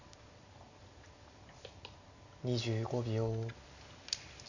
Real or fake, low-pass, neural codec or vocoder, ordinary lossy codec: real; 7.2 kHz; none; none